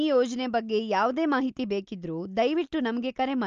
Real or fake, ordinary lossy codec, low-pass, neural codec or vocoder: real; Opus, 24 kbps; 7.2 kHz; none